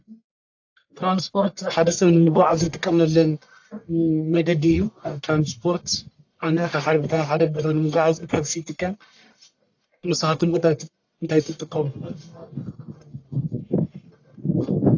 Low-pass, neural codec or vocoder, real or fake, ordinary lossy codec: 7.2 kHz; codec, 44.1 kHz, 1.7 kbps, Pupu-Codec; fake; AAC, 48 kbps